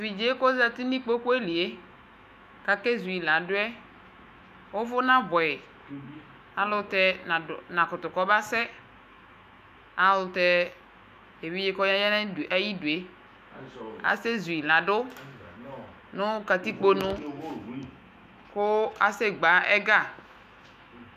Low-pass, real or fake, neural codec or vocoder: 14.4 kHz; fake; autoencoder, 48 kHz, 128 numbers a frame, DAC-VAE, trained on Japanese speech